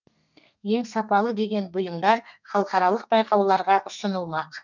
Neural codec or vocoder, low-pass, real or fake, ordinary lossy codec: codec, 32 kHz, 1.9 kbps, SNAC; 7.2 kHz; fake; none